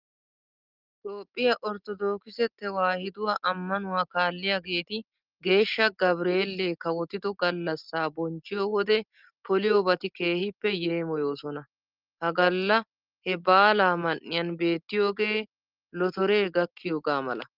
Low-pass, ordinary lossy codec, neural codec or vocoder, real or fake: 5.4 kHz; Opus, 32 kbps; none; real